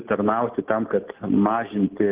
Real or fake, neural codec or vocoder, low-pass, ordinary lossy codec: real; none; 3.6 kHz; Opus, 16 kbps